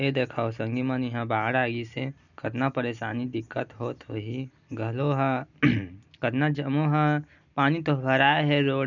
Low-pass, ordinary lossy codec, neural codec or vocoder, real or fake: 7.2 kHz; none; none; real